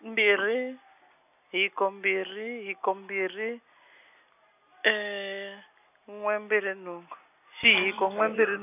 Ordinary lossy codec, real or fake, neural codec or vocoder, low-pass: none; real; none; 3.6 kHz